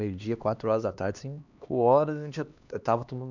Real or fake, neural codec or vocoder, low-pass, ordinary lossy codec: fake; codec, 16 kHz, 2 kbps, X-Codec, HuBERT features, trained on LibriSpeech; 7.2 kHz; none